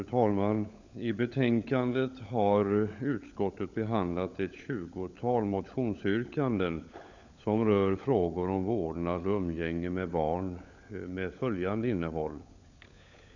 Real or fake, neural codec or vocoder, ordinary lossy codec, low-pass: fake; codec, 16 kHz, 16 kbps, FunCodec, trained on LibriTTS, 50 frames a second; none; 7.2 kHz